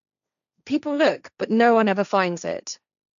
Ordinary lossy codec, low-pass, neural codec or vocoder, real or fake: none; 7.2 kHz; codec, 16 kHz, 1.1 kbps, Voila-Tokenizer; fake